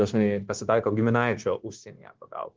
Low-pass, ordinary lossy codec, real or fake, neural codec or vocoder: 7.2 kHz; Opus, 16 kbps; fake; codec, 16 kHz, 0.9 kbps, LongCat-Audio-Codec